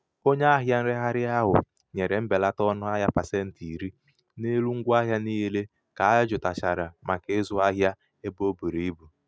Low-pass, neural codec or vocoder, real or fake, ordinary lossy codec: none; none; real; none